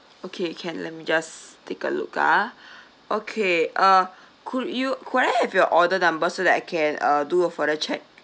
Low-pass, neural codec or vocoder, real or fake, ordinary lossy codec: none; none; real; none